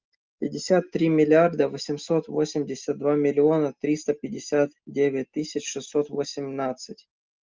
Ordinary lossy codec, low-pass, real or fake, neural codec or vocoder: Opus, 24 kbps; 7.2 kHz; real; none